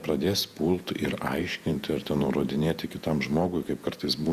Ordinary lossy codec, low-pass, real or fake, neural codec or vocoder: Opus, 64 kbps; 14.4 kHz; fake; vocoder, 48 kHz, 128 mel bands, Vocos